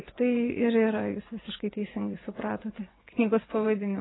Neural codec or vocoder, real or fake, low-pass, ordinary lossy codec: vocoder, 24 kHz, 100 mel bands, Vocos; fake; 7.2 kHz; AAC, 16 kbps